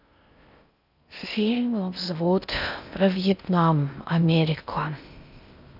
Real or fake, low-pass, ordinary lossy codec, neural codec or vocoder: fake; 5.4 kHz; none; codec, 16 kHz in and 24 kHz out, 0.6 kbps, FocalCodec, streaming, 2048 codes